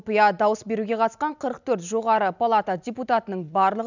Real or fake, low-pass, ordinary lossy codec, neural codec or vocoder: real; 7.2 kHz; none; none